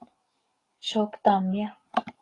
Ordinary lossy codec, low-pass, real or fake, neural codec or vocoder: AAC, 32 kbps; 10.8 kHz; fake; codec, 44.1 kHz, 7.8 kbps, DAC